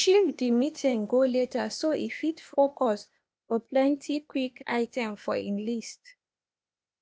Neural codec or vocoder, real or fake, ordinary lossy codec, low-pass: codec, 16 kHz, 0.8 kbps, ZipCodec; fake; none; none